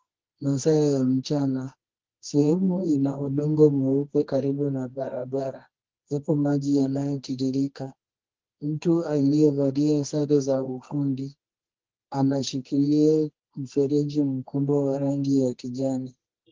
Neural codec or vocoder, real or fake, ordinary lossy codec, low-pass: codec, 24 kHz, 0.9 kbps, WavTokenizer, medium music audio release; fake; Opus, 16 kbps; 7.2 kHz